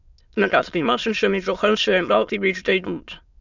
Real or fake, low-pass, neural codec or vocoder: fake; 7.2 kHz; autoencoder, 22.05 kHz, a latent of 192 numbers a frame, VITS, trained on many speakers